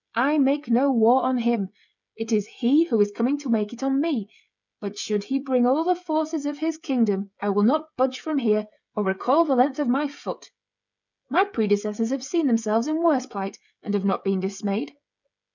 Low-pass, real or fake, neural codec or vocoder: 7.2 kHz; fake; codec, 16 kHz, 16 kbps, FreqCodec, smaller model